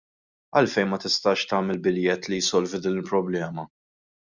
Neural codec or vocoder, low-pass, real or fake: none; 7.2 kHz; real